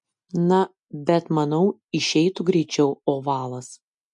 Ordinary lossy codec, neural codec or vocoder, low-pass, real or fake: MP3, 64 kbps; none; 10.8 kHz; real